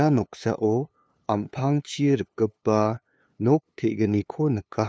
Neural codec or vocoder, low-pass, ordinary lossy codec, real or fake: codec, 16 kHz, 2 kbps, FunCodec, trained on LibriTTS, 25 frames a second; none; none; fake